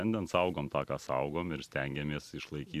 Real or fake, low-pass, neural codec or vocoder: real; 14.4 kHz; none